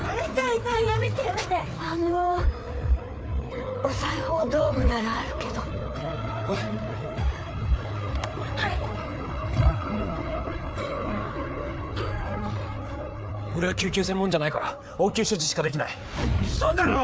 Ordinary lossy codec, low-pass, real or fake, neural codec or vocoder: none; none; fake; codec, 16 kHz, 4 kbps, FreqCodec, larger model